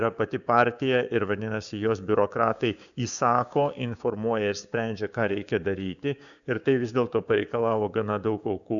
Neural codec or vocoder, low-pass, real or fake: codec, 16 kHz, 6 kbps, DAC; 7.2 kHz; fake